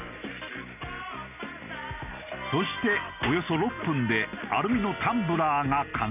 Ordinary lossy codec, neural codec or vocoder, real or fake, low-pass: none; none; real; 3.6 kHz